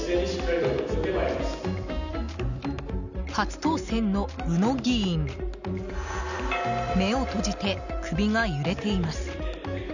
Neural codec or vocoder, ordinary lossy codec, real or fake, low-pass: none; none; real; 7.2 kHz